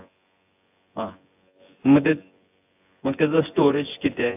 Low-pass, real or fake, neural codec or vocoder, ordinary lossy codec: 3.6 kHz; fake; vocoder, 24 kHz, 100 mel bands, Vocos; AAC, 32 kbps